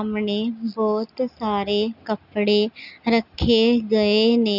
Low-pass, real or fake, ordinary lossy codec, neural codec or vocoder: 5.4 kHz; real; none; none